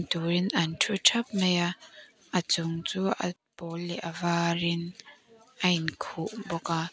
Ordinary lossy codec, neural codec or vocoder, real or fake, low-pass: none; none; real; none